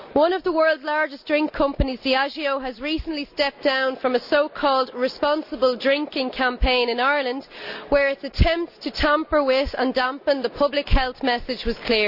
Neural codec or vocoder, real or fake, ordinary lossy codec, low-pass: none; real; none; 5.4 kHz